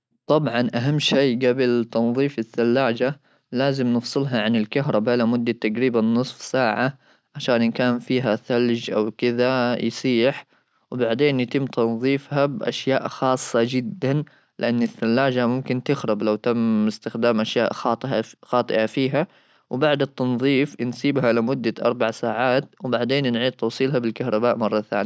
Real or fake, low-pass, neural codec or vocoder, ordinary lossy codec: real; none; none; none